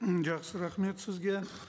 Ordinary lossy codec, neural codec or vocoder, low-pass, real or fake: none; none; none; real